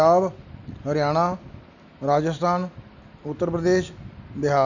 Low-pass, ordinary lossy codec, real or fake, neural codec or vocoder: 7.2 kHz; none; real; none